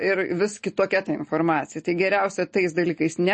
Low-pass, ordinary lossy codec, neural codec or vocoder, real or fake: 10.8 kHz; MP3, 32 kbps; none; real